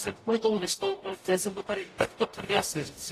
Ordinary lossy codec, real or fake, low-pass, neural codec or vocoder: AAC, 48 kbps; fake; 14.4 kHz; codec, 44.1 kHz, 0.9 kbps, DAC